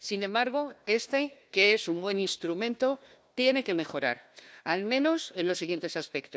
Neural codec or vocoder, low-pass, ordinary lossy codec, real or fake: codec, 16 kHz, 1 kbps, FunCodec, trained on Chinese and English, 50 frames a second; none; none; fake